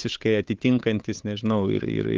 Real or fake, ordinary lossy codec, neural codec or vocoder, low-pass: fake; Opus, 32 kbps; codec, 16 kHz, 8 kbps, FunCodec, trained on Chinese and English, 25 frames a second; 7.2 kHz